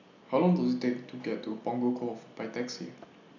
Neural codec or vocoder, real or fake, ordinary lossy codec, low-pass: none; real; none; 7.2 kHz